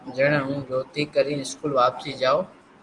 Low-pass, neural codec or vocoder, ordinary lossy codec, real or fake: 10.8 kHz; none; Opus, 24 kbps; real